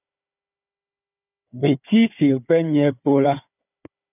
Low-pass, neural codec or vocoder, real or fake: 3.6 kHz; codec, 16 kHz, 4 kbps, FunCodec, trained on Chinese and English, 50 frames a second; fake